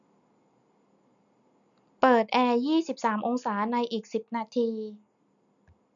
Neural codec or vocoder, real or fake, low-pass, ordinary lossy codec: none; real; 7.2 kHz; none